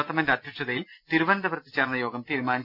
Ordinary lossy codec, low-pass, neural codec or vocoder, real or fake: none; 5.4 kHz; none; real